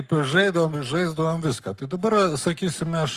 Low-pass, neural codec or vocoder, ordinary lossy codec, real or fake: 14.4 kHz; codec, 44.1 kHz, 7.8 kbps, Pupu-Codec; Opus, 32 kbps; fake